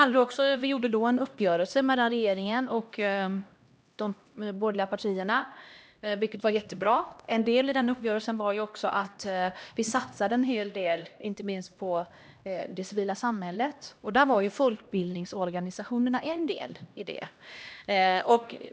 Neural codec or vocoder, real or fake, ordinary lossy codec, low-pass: codec, 16 kHz, 1 kbps, X-Codec, HuBERT features, trained on LibriSpeech; fake; none; none